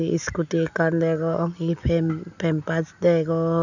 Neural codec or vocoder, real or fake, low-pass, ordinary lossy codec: none; real; 7.2 kHz; none